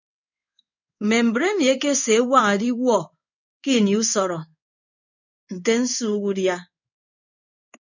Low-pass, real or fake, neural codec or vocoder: 7.2 kHz; fake; codec, 16 kHz in and 24 kHz out, 1 kbps, XY-Tokenizer